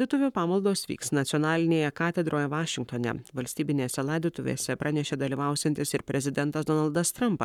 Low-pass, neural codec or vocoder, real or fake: 19.8 kHz; codec, 44.1 kHz, 7.8 kbps, Pupu-Codec; fake